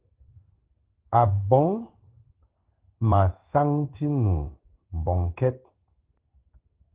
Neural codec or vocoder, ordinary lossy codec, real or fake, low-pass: codec, 16 kHz in and 24 kHz out, 1 kbps, XY-Tokenizer; Opus, 16 kbps; fake; 3.6 kHz